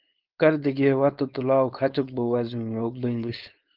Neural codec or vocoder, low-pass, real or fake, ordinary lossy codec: codec, 16 kHz, 4.8 kbps, FACodec; 5.4 kHz; fake; Opus, 32 kbps